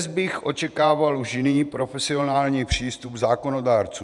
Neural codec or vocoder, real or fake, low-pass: vocoder, 48 kHz, 128 mel bands, Vocos; fake; 10.8 kHz